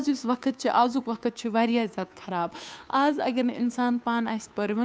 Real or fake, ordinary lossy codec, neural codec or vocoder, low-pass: fake; none; codec, 16 kHz, 2 kbps, FunCodec, trained on Chinese and English, 25 frames a second; none